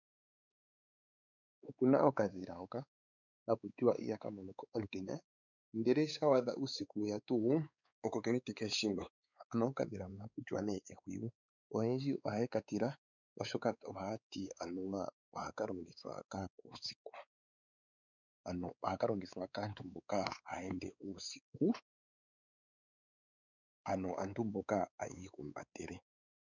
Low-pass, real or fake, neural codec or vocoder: 7.2 kHz; fake; codec, 16 kHz, 4 kbps, X-Codec, WavLM features, trained on Multilingual LibriSpeech